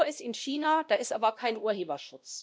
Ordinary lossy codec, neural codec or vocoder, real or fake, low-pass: none; codec, 16 kHz, 1 kbps, X-Codec, WavLM features, trained on Multilingual LibriSpeech; fake; none